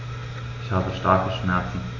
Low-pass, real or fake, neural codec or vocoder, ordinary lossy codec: 7.2 kHz; real; none; AAC, 48 kbps